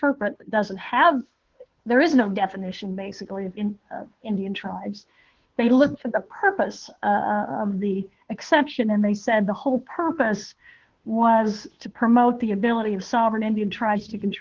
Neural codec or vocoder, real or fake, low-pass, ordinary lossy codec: codec, 16 kHz, 2 kbps, FunCodec, trained on Chinese and English, 25 frames a second; fake; 7.2 kHz; Opus, 16 kbps